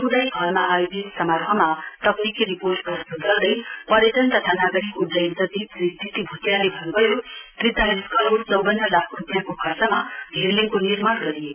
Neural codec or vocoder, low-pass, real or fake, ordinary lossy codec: none; 3.6 kHz; real; none